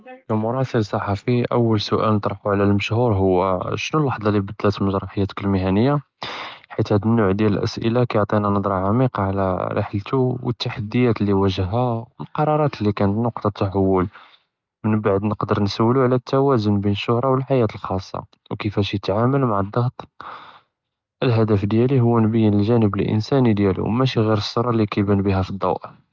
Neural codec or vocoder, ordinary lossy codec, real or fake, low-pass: none; Opus, 24 kbps; real; 7.2 kHz